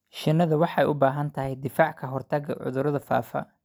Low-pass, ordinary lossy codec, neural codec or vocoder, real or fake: none; none; none; real